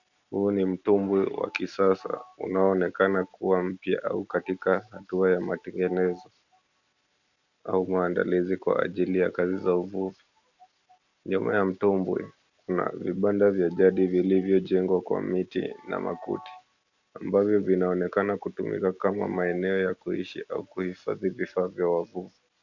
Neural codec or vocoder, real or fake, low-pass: none; real; 7.2 kHz